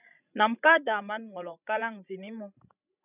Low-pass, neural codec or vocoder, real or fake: 3.6 kHz; codec, 16 kHz, 16 kbps, FreqCodec, larger model; fake